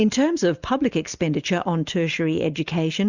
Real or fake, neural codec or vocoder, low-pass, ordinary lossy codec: real; none; 7.2 kHz; Opus, 64 kbps